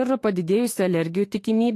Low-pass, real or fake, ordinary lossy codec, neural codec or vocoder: 14.4 kHz; fake; AAC, 48 kbps; autoencoder, 48 kHz, 32 numbers a frame, DAC-VAE, trained on Japanese speech